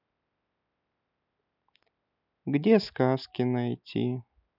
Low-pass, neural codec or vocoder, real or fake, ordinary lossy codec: 5.4 kHz; none; real; none